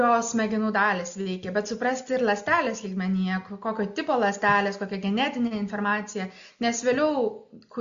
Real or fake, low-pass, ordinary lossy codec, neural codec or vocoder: real; 7.2 kHz; MP3, 64 kbps; none